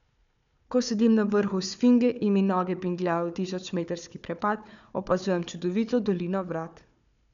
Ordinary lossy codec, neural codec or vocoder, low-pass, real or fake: none; codec, 16 kHz, 4 kbps, FunCodec, trained on Chinese and English, 50 frames a second; 7.2 kHz; fake